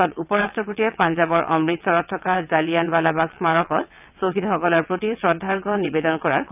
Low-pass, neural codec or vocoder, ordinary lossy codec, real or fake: 3.6 kHz; vocoder, 22.05 kHz, 80 mel bands, WaveNeXt; none; fake